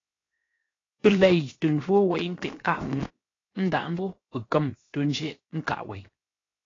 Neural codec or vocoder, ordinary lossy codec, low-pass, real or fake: codec, 16 kHz, 0.7 kbps, FocalCodec; AAC, 32 kbps; 7.2 kHz; fake